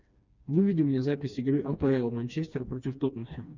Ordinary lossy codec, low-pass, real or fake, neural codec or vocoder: Opus, 64 kbps; 7.2 kHz; fake; codec, 16 kHz, 2 kbps, FreqCodec, smaller model